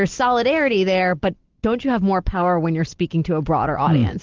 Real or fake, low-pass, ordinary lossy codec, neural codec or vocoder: real; 7.2 kHz; Opus, 16 kbps; none